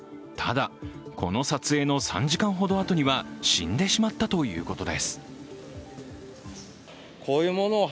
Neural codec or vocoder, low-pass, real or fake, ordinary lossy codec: none; none; real; none